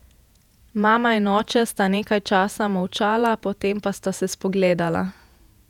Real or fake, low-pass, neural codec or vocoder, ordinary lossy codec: fake; 19.8 kHz; vocoder, 48 kHz, 128 mel bands, Vocos; none